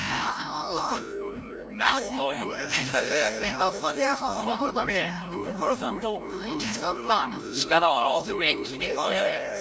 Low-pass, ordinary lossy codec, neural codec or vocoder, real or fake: none; none; codec, 16 kHz, 0.5 kbps, FreqCodec, larger model; fake